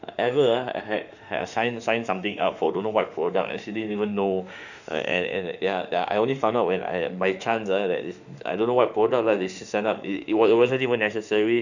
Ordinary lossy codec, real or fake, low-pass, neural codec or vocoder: none; fake; 7.2 kHz; autoencoder, 48 kHz, 32 numbers a frame, DAC-VAE, trained on Japanese speech